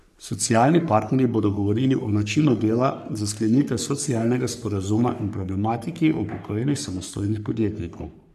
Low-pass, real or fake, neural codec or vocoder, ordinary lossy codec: 14.4 kHz; fake; codec, 44.1 kHz, 3.4 kbps, Pupu-Codec; none